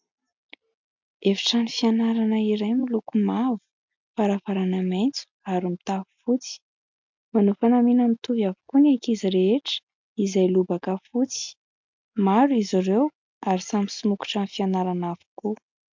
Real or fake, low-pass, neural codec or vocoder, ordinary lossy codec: real; 7.2 kHz; none; MP3, 64 kbps